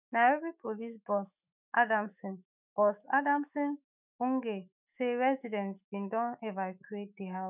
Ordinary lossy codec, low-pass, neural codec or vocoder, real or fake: none; 3.6 kHz; autoencoder, 48 kHz, 128 numbers a frame, DAC-VAE, trained on Japanese speech; fake